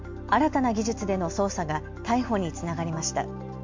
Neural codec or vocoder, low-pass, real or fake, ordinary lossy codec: none; 7.2 kHz; real; MP3, 48 kbps